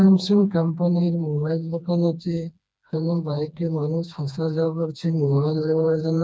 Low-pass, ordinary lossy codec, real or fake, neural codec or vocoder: none; none; fake; codec, 16 kHz, 2 kbps, FreqCodec, smaller model